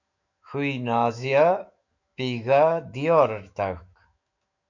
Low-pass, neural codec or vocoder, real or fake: 7.2 kHz; autoencoder, 48 kHz, 128 numbers a frame, DAC-VAE, trained on Japanese speech; fake